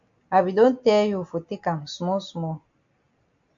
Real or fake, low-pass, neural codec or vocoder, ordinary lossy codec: real; 7.2 kHz; none; AAC, 64 kbps